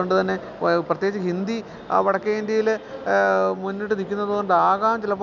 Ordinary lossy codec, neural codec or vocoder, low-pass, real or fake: none; none; 7.2 kHz; real